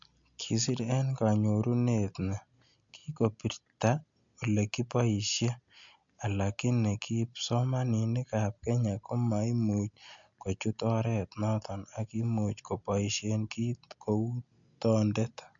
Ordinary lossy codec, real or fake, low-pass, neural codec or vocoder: MP3, 64 kbps; real; 7.2 kHz; none